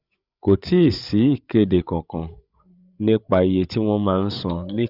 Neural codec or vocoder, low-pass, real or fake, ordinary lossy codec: codec, 16 kHz, 8 kbps, FreqCodec, larger model; 5.4 kHz; fake; Opus, 64 kbps